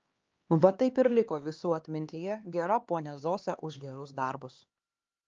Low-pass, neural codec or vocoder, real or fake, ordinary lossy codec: 7.2 kHz; codec, 16 kHz, 2 kbps, X-Codec, HuBERT features, trained on LibriSpeech; fake; Opus, 24 kbps